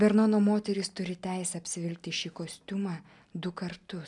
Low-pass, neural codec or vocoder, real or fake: 10.8 kHz; none; real